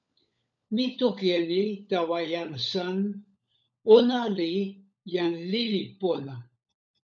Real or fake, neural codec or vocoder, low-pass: fake; codec, 16 kHz, 16 kbps, FunCodec, trained on LibriTTS, 50 frames a second; 7.2 kHz